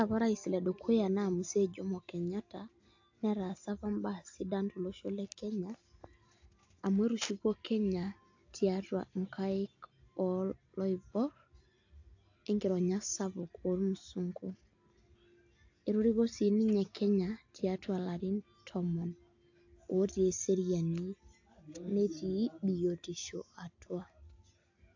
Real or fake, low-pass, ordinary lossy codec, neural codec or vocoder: real; 7.2 kHz; AAC, 48 kbps; none